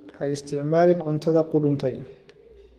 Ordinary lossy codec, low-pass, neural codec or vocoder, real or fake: Opus, 24 kbps; 14.4 kHz; codec, 32 kHz, 1.9 kbps, SNAC; fake